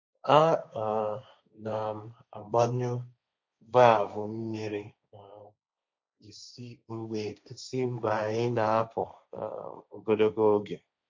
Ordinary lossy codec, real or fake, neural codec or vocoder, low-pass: MP3, 48 kbps; fake; codec, 16 kHz, 1.1 kbps, Voila-Tokenizer; 7.2 kHz